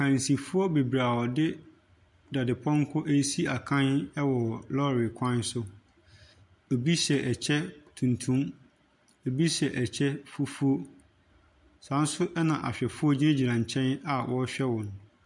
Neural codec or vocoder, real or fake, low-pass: none; real; 10.8 kHz